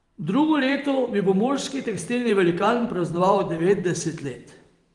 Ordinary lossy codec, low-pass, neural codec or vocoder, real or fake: Opus, 24 kbps; 10.8 kHz; vocoder, 44.1 kHz, 128 mel bands every 512 samples, BigVGAN v2; fake